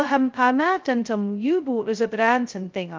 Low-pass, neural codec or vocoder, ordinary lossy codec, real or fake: 7.2 kHz; codec, 16 kHz, 0.2 kbps, FocalCodec; Opus, 32 kbps; fake